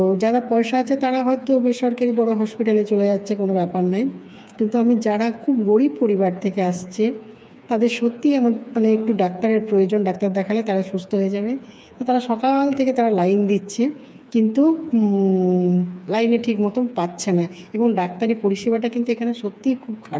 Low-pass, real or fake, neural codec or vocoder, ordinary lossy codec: none; fake; codec, 16 kHz, 4 kbps, FreqCodec, smaller model; none